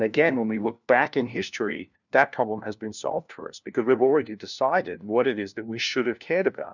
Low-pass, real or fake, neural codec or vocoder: 7.2 kHz; fake; codec, 16 kHz, 1 kbps, FunCodec, trained on LibriTTS, 50 frames a second